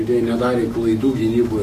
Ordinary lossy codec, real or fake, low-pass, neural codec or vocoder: AAC, 48 kbps; fake; 14.4 kHz; autoencoder, 48 kHz, 128 numbers a frame, DAC-VAE, trained on Japanese speech